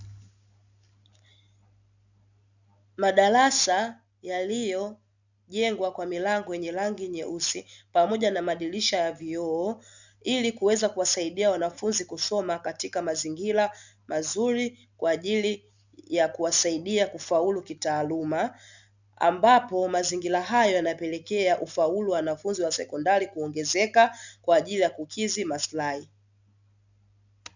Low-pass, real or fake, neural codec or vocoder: 7.2 kHz; real; none